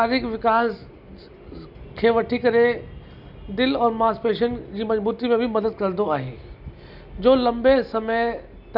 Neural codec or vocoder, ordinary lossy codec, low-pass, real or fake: none; none; 5.4 kHz; real